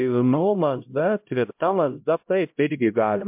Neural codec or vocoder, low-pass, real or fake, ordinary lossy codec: codec, 16 kHz, 0.5 kbps, X-Codec, HuBERT features, trained on LibriSpeech; 3.6 kHz; fake; MP3, 32 kbps